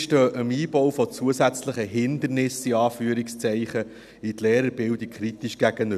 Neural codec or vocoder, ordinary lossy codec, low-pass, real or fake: none; none; 14.4 kHz; real